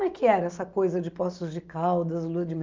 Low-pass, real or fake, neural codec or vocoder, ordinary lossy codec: 7.2 kHz; real; none; Opus, 24 kbps